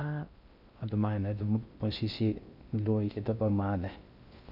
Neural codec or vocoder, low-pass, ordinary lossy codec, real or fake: codec, 16 kHz in and 24 kHz out, 0.6 kbps, FocalCodec, streaming, 2048 codes; 5.4 kHz; none; fake